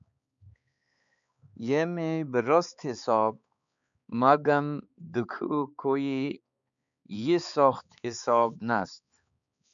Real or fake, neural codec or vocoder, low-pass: fake; codec, 16 kHz, 4 kbps, X-Codec, HuBERT features, trained on balanced general audio; 7.2 kHz